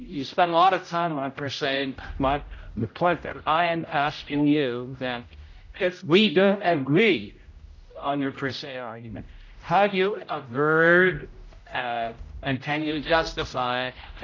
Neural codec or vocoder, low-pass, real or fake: codec, 16 kHz, 0.5 kbps, X-Codec, HuBERT features, trained on general audio; 7.2 kHz; fake